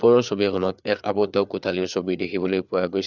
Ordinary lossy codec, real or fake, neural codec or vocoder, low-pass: none; fake; codec, 16 kHz, 4 kbps, FreqCodec, larger model; 7.2 kHz